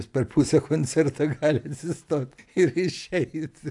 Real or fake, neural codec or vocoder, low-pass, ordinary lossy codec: real; none; 10.8 kHz; AAC, 64 kbps